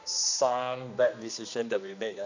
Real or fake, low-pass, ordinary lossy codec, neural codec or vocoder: fake; 7.2 kHz; none; codec, 16 kHz, 2 kbps, X-Codec, HuBERT features, trained on general audio